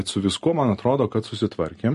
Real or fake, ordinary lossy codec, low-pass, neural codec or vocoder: real; MP3, 48 kbps; 14.4 kHz; none